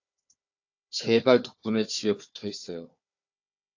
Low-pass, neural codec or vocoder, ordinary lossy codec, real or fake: 7.2 kHz; codec, 16 kHz, 4 kbps, FunCodec, trained on Chinese and English, 50 frames a second; AAC, 48 kbps; fake